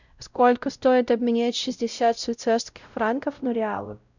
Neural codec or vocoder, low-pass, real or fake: codec, 16 kHz, 0.5 kbps, X-Codec, WavLM features, trained on Multilingual LibriSpeech; 7.2 kHz; fake